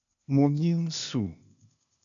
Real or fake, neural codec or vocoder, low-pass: fake; codec, 16 kHz, 0.8 kbps, ZipCodec; 7.2 kHz